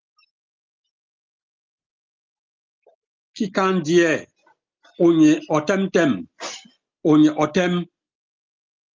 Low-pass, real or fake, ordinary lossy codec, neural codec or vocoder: 7.2 kHz; real; Opus, 24 kbps; none